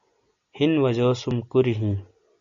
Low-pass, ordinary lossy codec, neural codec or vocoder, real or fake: 7.2 kHz; MP3, 64 kbps; none; real